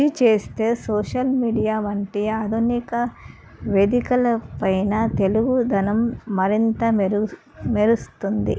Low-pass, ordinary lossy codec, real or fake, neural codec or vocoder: none; none; real; none